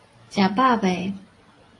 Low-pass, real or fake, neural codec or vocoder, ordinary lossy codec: 10.8 kHz; real; none; AAC, 32 kbps